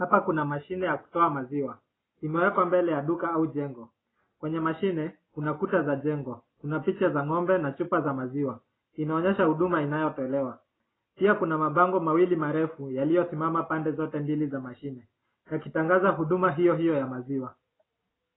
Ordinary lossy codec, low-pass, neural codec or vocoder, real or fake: AAC, 16 kbps; 7.2 kHz; none; real